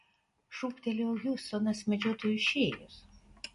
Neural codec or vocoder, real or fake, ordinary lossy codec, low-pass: none; real; MP3, 48 kbps; 10.8 kHz